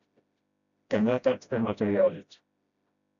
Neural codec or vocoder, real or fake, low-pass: codec, 16 kHz, 0.5 kbps, FreqCodec, smaller model; fake; 7.2 kHz